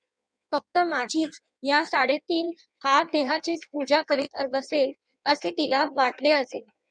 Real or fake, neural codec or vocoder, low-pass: fake; codec, 16 kHz in and 24 kHz out, 1.1 kbps, FireRedTTS-2 codec; 9.9 kHz